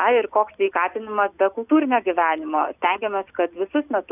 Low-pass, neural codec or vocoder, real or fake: 3.6 kHz; none; real